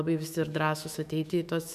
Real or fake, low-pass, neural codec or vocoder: fake; 14.4 kHz; autoencoder, 48 kHz, 128 numbers a frame, DAC-VAE, trained on Japanese speech